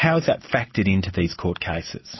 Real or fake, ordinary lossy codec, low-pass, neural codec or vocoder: real; MP3, 24 kbps; 7.2 kHz; none